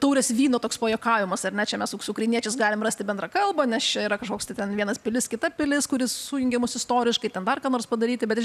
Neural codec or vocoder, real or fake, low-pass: none; real; 14.4 kHz